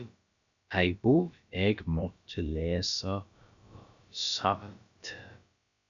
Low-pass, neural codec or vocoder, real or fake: 7.2 kHz; codec, 16 kHz, about 1 kbps, DyCAST, with the encoder's durations; fake